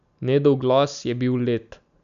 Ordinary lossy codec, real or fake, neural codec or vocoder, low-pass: none; real; none; 7.2 kHz